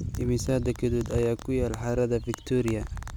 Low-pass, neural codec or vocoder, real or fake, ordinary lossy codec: none; none; real; none